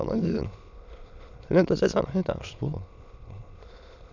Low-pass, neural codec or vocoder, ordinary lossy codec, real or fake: 7.2 kHz; autoencoder, 22.05 kHz, a latent of 192 numbers a frame, VITS, trained on many speakers; none; fake